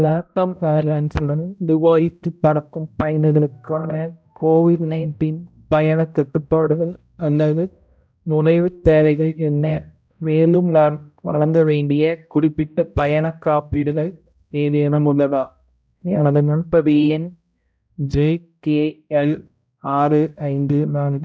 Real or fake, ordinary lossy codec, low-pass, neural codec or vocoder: fake; none; none; codec, 16 kHz, 0.5 kbps, X-Codec, HuBERT features, trained on balanced general audio